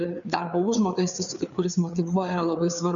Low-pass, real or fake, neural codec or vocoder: 7.2 kHz; fake; codec, 16 kHz, 4 kbps, FreqCodec, larger model